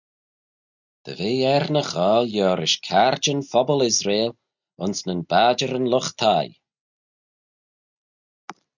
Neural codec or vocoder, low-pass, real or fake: none; 7.2 kHz; real